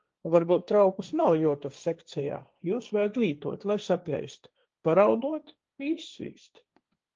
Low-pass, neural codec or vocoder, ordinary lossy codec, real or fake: 7.2 kHz; codec, 16 kHz, 1.1 kbps, Voila-Tokenizer; Opus, 24 kbps; fake